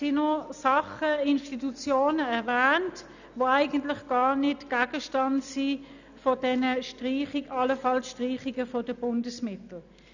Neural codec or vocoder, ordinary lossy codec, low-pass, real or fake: none; none; 7.2 kHz; real